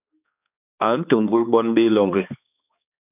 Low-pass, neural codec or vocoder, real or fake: 3.6 kHz; codec, 16 kHz, 2 kbps, X-Codec, HuBERT features, trained on balanced general audio; fake